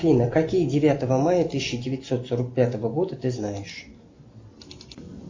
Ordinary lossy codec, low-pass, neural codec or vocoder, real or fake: MP3, 48 kbps; 7.2 kHz; none; real